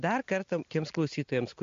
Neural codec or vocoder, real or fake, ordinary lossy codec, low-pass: none; real; MP3, 48 kbps; 7.2 kHz